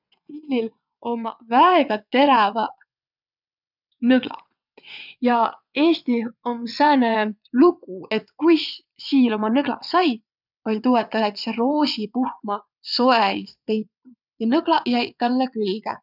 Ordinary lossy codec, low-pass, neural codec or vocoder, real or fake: none; 5.4 kHz; vocoder, 22.05 kHz, 80 mel bands, WaveNeXt; fake